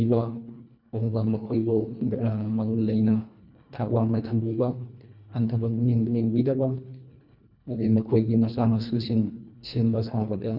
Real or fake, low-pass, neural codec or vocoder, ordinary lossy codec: fake; 5.4 kHz; codec, 24 kHz, 1.5 kbps, HILCodec; none